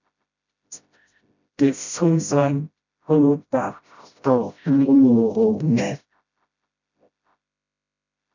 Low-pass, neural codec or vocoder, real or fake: 7.2 kHz; codec, 16 kHz, 0.5 kbps, FreqCodec, smaller model; fake